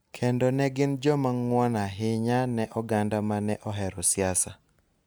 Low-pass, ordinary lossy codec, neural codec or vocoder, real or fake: none; none; none; real